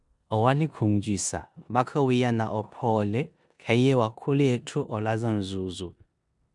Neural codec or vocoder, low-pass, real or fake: codec, 16 kHz in and 24 kHz out, 0.9 kbps, LongCat-Audio-Codec, four codebook decoder; 10.8 kHz; fake